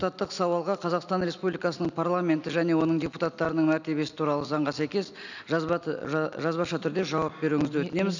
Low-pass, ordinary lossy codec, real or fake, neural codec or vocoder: 7.2 kHz; none; real; none